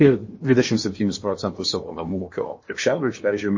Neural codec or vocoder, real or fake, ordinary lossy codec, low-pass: codec, 16 kHz in and 24 kHz out, 0.8 kbps, FocalCodec, streaming, 65536 codes; fake; MP3, 32 kbps; 7.2 kHz